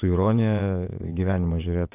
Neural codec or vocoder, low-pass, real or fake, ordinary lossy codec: vocoder, 22.05 kHz, 80 mel bands, WaveNeXt; 3.6 kHz; fake; AAC, 32 kbps